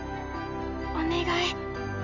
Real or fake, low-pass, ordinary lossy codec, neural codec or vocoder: real; 7.2 kHz; none; none